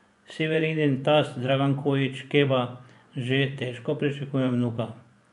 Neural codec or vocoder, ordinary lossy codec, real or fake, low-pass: vocoder, 24 kHz, 100 mel bands, Vocos; none; fake; 10.8 kHz